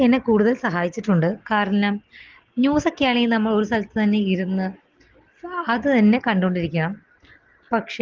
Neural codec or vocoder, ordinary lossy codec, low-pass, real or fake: none; Opus, 16 kbps; 7.2 kHz; real